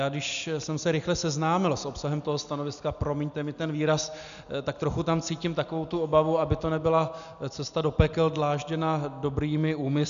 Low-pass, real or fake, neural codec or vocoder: 7.2 kHz; real; none